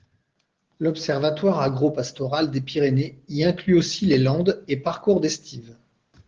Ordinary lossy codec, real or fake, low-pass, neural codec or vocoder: Opus, 16 kbps; real; 7.2 kHz; none